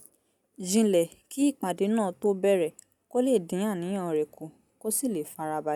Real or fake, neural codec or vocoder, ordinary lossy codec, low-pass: real; none; none; 19.8 kHz